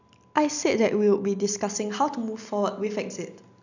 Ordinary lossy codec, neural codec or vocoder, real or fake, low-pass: none; none; real; 7.2 kHz